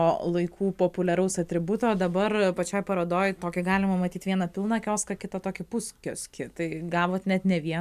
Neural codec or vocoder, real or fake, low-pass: none; real; 14.4 kHz